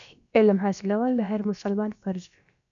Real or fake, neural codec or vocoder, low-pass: fake; codec, 16 kHz, about 1 kbps, DyCAST, with the encoder's durations; 7.2 kHz